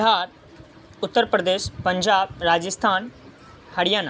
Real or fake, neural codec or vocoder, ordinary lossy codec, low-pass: real; none; none; none